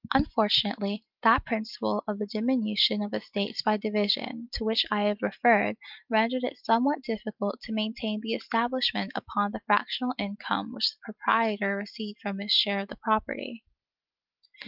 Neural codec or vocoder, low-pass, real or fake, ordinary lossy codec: none; 5.4 kHz; real; Opus, 24 kbps